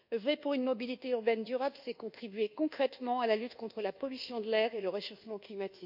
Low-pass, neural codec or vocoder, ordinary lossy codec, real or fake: 5.4 kHz; codec, 24 kHz, 1.2 kbps, DualCodec; none; fake